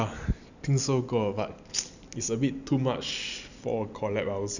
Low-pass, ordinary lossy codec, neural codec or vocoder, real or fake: 7.2 kHz; none; none; real